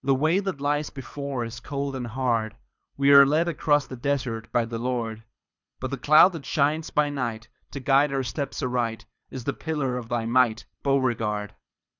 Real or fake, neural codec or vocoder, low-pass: fake; codec, 24 kHz, 6 kbps, HILCodec; 7.2 kHz